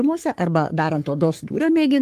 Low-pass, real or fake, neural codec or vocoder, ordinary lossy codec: 14.4 kHz; fake; codec, 44.1 kHz, 3.4 kbps, Pupu-Codec; Opus, 24 kbps